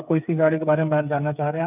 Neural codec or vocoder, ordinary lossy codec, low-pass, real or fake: codec, 16 kHz, 8 kbps, FreqCodec, smaller model; none; 3.6 kHz; fake